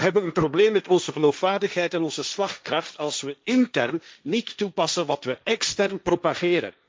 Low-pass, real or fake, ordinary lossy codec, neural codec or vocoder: none; fake; none; codec, 16 kHz, 1.1 kbps, Voila-Tokenizer